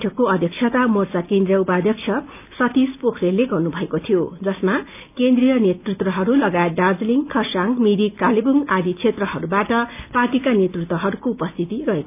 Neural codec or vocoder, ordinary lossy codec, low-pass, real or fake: none; none; 3.6 kHz; real